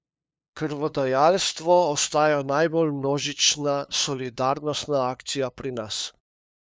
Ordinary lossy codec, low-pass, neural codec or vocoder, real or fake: none; none; codec, 16 kHz, 2 kbps, FunCodec, trained on LibriTTS, 25 frames a second; fake